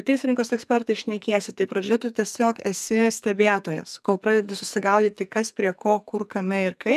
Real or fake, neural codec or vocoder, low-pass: fake; codec, 44.1 kHz, 2.6 kbps, SNAC; 14.4 kHz